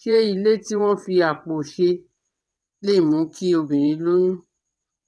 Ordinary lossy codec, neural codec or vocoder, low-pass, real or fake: none; vocoder, 22.05 kHz, 80 mel bands, WaveNeXt; none; fake